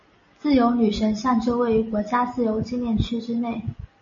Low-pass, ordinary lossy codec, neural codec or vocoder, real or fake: 7.2 kHz; MP3, 32 kbps; none; real